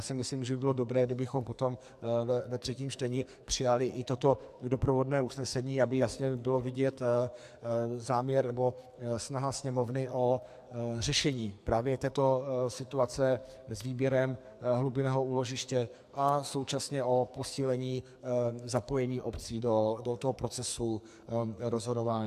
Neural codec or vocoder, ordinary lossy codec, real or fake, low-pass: codec, 44.1 kHz, 2.6 kbps, SNAC; AAC, 96 kbps; fake; 14.4 kHz